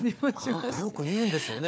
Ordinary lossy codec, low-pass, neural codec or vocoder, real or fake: none; none; codec, 16 kHz, 4 kbps, FunCodec, trained on Chinese and English, 50 frames a second; fake